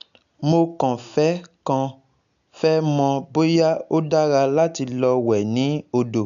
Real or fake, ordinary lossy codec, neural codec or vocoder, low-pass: real; none; none; 7.2 kHz